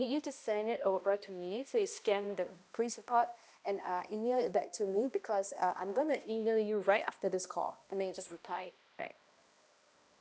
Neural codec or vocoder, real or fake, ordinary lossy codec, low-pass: codec, 16 kHz, 1 kbps, X-Codec, HuBERT features, trained on balanced general audio; fake; none; none